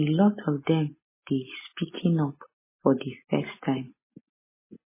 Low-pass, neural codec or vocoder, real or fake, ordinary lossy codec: 3.6 kHz; none; real; MP3, 16 kbps